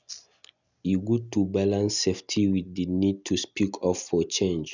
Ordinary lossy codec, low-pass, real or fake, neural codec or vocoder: none; 7.2 kHz; real; none